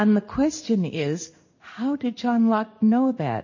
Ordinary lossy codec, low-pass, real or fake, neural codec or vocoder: MP3, 32 kbps; 7.2 kHz; fake; codec, 16 kHz in and 24 kHz out, 1 kbps, XY-Tokenizer